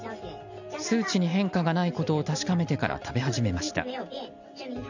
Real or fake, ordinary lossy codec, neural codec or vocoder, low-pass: real; none; none; 7.2 kHz